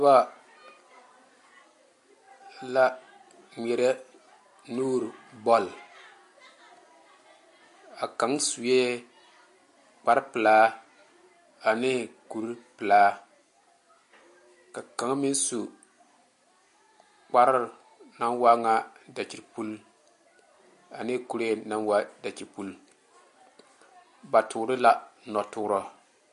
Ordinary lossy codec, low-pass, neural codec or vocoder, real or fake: MP3, 48 kbps; 14.4 kHz; none; real